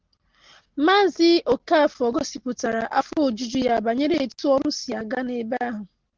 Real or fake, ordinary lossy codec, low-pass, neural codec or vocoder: real; Opus, 16 kbps; 7.2 kHz; none